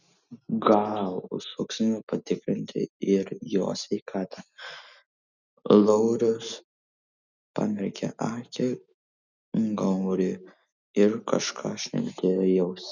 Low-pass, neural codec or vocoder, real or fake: 7.2 kHz; none; real